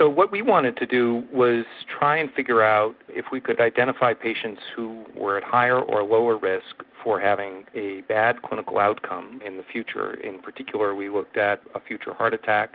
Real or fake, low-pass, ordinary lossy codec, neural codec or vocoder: real; 5.4 kHz; Opus, 32 kbps; none